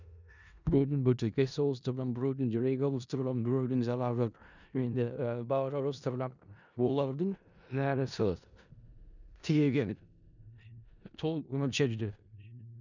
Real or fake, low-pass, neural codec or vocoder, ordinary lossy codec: fake; 7.2 kHz; codec, 16 kHz in and 24 kHz out, 0.4 kbps, LongCat-Audio-Codec, four codebook decoder; none